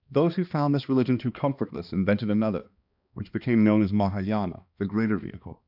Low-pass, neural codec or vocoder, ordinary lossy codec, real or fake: 5.4 kHz; codec, 16 kHz, 2 kbps, X-Codec, HuBERT features, trained on balanced general audio; AAC, 48 kbps; fake